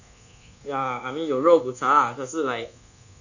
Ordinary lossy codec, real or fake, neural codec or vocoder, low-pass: none; fake; codec, 24 kHz, 1.2 kbps, DualCodec; 7.2 kHz